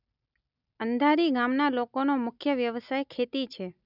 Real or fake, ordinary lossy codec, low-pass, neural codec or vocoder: real; none; 5.4 kHz; none